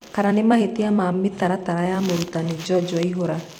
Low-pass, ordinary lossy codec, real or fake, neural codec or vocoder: 19.8 kHz; none; fake; vocoder, 44.1 kHz, 128 mel bands every 256 samples, BigVGAN v2